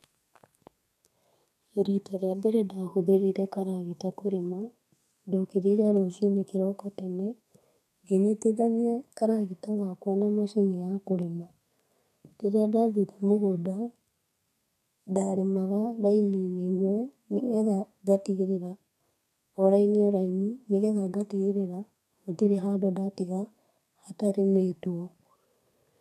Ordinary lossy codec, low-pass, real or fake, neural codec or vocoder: none; 14.4 kHz; fake; codec, 32 kHz, 1.9 kbps, SNAC